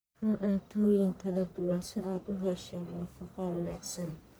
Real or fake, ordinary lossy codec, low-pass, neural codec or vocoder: fake; none; none; codec, 44.1 kHz, 1.7 kbps, Pupu-Codec